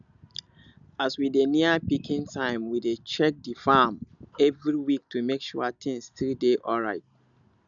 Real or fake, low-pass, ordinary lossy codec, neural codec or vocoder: real; 7.2 kHz; none; none